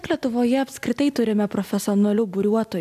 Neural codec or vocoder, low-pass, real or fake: none; 14.4 kHz; real